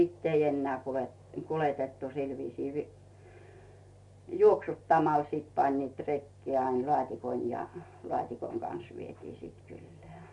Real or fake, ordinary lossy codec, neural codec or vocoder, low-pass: real; MP3, 48 kbps; none; 10.8 kHz